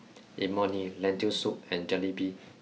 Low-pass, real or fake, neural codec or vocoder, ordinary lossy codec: none; real; none; none